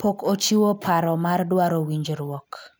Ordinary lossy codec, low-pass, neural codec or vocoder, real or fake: none; none; none; real